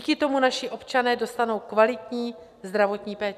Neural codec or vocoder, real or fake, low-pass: none; real; 14.4 kHz